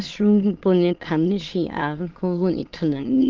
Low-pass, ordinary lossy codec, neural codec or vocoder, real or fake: 7.2 kHz; Opus, 16 kbps; autoencoder, 22.05 kHz, a latent of 192 numbers a frame, VITS, trained on many speakers; fake